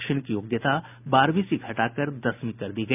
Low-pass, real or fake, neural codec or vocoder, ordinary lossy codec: 3.6 kHz; real; none; none